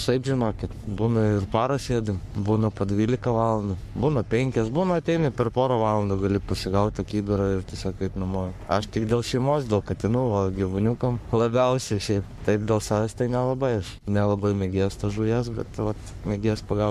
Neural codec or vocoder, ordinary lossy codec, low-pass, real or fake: codec, 44.1 kHz, 3.4 kbps, Pupu-Codec; MP3, 96 kbps; 14.4 kHz; fake